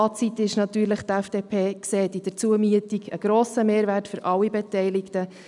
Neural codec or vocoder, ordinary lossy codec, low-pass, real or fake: none; none; 10.8 kHz; real